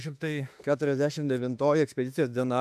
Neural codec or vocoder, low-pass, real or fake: autoencoder, 48 kHz, 32 numbers a frame, DAC-VAE, trained on Japanese speech; 14.4 kHz; fake